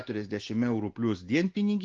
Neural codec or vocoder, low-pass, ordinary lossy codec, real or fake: none; 7.2 kHz; Opus, 16 kbps; real